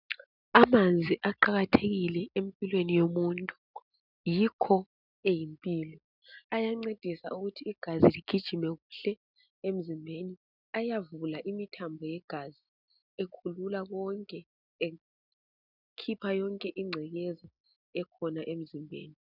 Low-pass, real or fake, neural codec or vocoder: 5.4 kHz; real; none